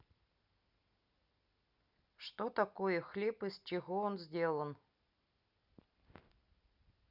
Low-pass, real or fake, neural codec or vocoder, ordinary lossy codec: 5.4 kHz; real; none; Opus, 64 kbps